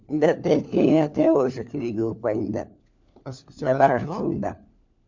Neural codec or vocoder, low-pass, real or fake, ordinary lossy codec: codec, 16 kHz, 4 kbps, FunCodec, trained on LibriTTS, 50 frames a second; 7.2 kHz; fake; MP3, 64 kbps